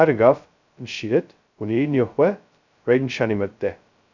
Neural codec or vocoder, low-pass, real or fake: codec, 16 kHz, 0.2 kbps, FocalCodec; 7.2 kHz; fake